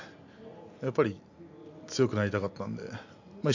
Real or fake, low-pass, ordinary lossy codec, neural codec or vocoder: real; 7.2 kHz; AAC, 48 kbps; none